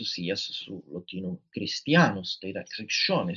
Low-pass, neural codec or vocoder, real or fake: 7.2 kHz; none; real